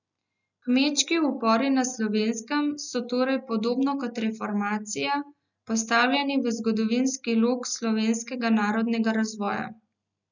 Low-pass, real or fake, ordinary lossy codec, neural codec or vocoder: 7.2 kHz; real; none; none